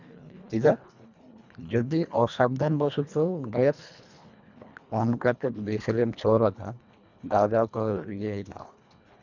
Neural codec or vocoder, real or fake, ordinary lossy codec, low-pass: codec, 24 kHz, 1.5 kbps, HILCodec; fake; none; 7.2 kHz